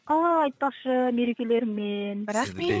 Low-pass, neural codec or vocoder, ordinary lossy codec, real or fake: none; codec, 16 kHz, 8 kbps, FreqCodec, larger model; none; fake